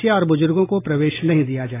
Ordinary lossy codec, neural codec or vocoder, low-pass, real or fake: AAC, 16 kbps; none; 3.6 kHz; real